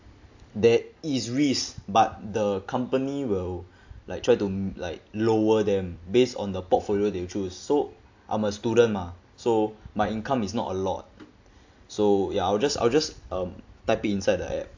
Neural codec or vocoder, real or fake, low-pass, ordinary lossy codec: none; real; 7.2 kHz; AAC, 48 kbps